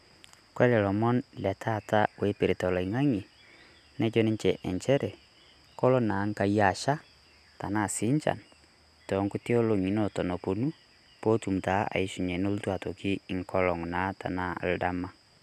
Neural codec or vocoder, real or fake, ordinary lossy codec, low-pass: none; real; none; 14.4 kHz